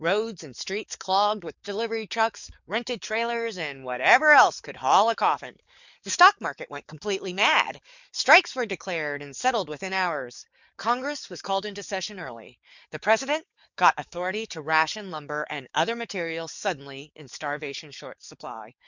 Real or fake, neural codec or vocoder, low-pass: fake; codec, 44.1 kHz, 7.8 kbps, DAC; 7.2 kHz